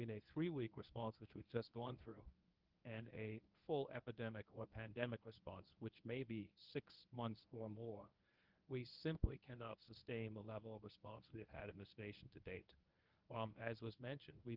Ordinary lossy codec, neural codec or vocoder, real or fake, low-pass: Opus, 32 kbps; codec, 24 kHz, 0.9 kbps, WavTokenizer, medium speech release version 1; fake; 5.4 kHz